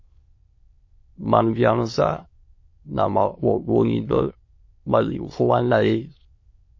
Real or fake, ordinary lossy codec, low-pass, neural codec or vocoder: fake; MP3, 32 kbps; 7.2 kHz; autoencoder, 22.05 kHz, a latent of 192 numbers a frame, VITS, trained on many speakers